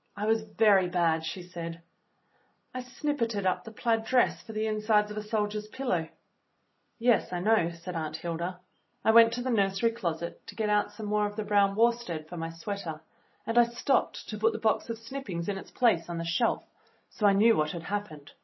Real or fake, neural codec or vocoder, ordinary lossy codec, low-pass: real; none; MP3, 24 kbps; 7.2 kHz